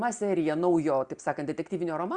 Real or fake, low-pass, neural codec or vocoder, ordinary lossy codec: real; 9.9 kHz; none; Opus, 64 kbps